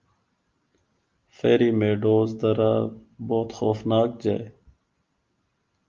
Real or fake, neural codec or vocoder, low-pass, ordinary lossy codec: real; none; 7.2 kHz; Opus, 32 kbps